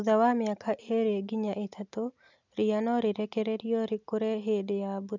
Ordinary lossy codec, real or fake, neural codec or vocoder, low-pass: none; real; none; 7.2 kHz